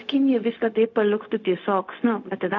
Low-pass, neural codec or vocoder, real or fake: 7.2 kHz; codec, 16 kHz, 0.4 kbps, LongCat-Audio-Codec; fake